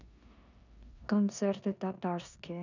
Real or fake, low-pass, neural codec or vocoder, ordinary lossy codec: fake; 7.2 kHz; codec, 16 kHz in and 24 kHz out, 0.9 kbps, LongCat-Audio-Codec, four codebook decoder; none